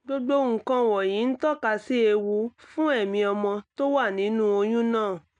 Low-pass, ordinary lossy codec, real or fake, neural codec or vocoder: 9.9 kHz; MP3, 96 kbps; real; none